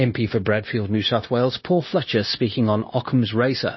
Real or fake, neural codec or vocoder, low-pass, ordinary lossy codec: fake; codec, 16 kHz, 0.9 kbps, LongCat-Audio-Codec; 7.2 kHz; MP3, 24 kbps